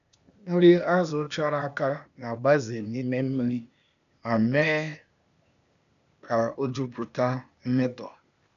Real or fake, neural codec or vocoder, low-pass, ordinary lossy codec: fake; codec, 16 kHz, 0.8 kbps, ZipCodec; 7.2 kHz; none